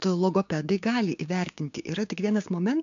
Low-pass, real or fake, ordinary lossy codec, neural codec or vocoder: 7.2 kHz; fake; AAC, 64 kbps; codec, 16 kHz, 6 kbps, DAC